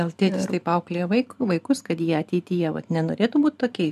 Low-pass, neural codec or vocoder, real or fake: 14.4 kHz; vocoder, 44.1 kHz, 128 mel bands every 512 samples, BigVGAN v2; fake